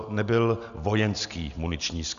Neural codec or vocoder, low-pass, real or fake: none; 7.2 kHz; real